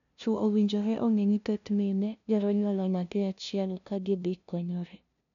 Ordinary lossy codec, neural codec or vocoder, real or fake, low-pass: none; codec, 16 kHz, 0.5 kbps, FunCodec, trained on LibriTTS, 25 frames a second; fake; 7.2 kHz